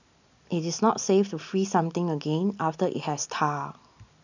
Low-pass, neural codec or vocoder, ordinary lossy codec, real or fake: 7.2 kHz; none; none; real